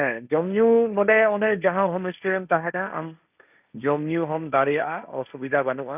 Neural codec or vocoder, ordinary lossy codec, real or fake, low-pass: codec, 16 kHz, 1.1 kbps, Voila-Tokenizer; none; fake; 3.6 kHz